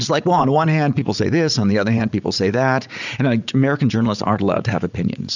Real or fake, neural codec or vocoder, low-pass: fake; vocoder, 44.1 kHz, 80 mel bands, Vocos; 7.2 kHz